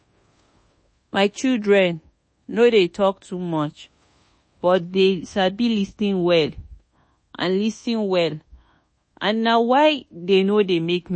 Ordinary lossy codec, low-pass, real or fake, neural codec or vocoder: MP3, 32 kbps; 9.9 kHz; fake; codec, 24 kHz, 1.2 kbps, DualCodec